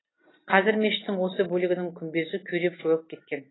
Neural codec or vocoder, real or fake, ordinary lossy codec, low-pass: none; real; AAC, 16 kbps; 7.2 kHz